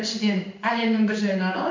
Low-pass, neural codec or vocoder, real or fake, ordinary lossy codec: 7.2 kHz; codec, 16 kHz in and 24 kHz out, 1 kbps, XY-Tokenizer; fake; MP3, 48 kbps